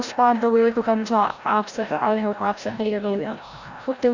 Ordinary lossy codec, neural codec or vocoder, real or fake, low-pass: Opus, 64 kbps; codec, 16 kHz, 0.5 kbps, FreqCodec, larger model; fake; 7.2 kHz